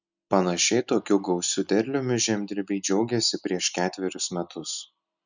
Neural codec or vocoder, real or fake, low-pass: none; real; 7.2 kHz